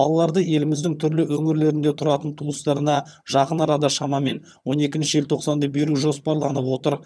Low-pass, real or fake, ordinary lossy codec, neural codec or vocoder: none; fake; none; vocoder, 22.05 kHz, 80 mel bands, HiFi-GAN